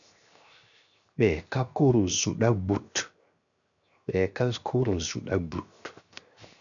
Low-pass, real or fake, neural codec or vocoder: 7.2 kHz; fake; codec, 16 kHz, 0.7 kbps, FocalCodec